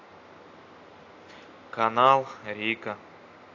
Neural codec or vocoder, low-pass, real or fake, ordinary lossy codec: none; 7.2 kHz; real; AAC, 48 kbps